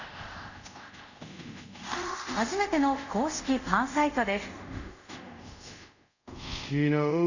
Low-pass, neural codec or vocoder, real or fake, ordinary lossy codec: 7.2 kHz; codec, 24 kHz, 0.5 kbps, DualCodec; fake; none